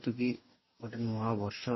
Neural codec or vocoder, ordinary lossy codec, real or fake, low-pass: codec, 44.1 kHz, 2.6 kbps, DAC; MP3, 24 kbps; fake; 7.2 kHz